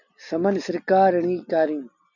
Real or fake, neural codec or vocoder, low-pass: real; none; 7.2 kHz